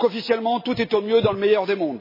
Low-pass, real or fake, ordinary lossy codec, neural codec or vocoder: 5.4 kHz; real; MP3, 24 kbps; none